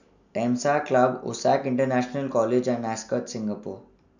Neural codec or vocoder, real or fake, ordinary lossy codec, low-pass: none; real; none; 7.2 kHz